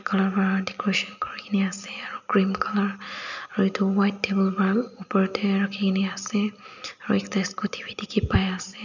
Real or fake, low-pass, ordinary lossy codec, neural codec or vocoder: real; 7.2 kHz; none; none